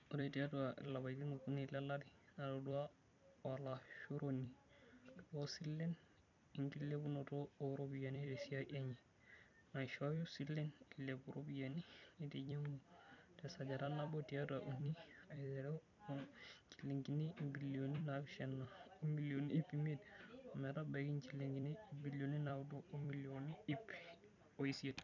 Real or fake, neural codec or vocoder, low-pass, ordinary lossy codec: fake; vocoder, 44.1 kHz, 128 mel bands every 256 samples, BigVGAN v2; 7.2 kHz; none